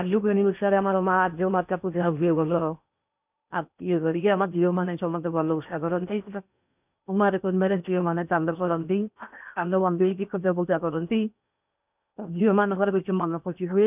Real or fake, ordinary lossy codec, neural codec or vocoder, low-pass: fake; none; codec, 16 kHz in and 24 kHz out, 0.8 kbps, FocalCodec, streaming, 65536 codes; 3.6 kHz